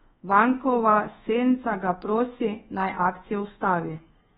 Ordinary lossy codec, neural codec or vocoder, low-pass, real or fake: AAC, 16 kbps; codec, 24 kHz, 1.2 kbps, DualCodec; 10.8 kHz; fake